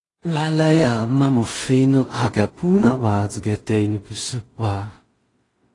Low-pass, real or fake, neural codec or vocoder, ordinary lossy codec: 10.8 kHz; fake; codec, 16 kHz in and 24 kHz out, 0.4 kbps, LongCat-Audio-Codec, two codebook decoder; AAC, 32 kbps